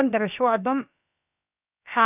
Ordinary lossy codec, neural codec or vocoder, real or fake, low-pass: none; codec, 16 kHz, about 1 kbps, DyCAST, with the encoder's durations; fake; 3.6 kHz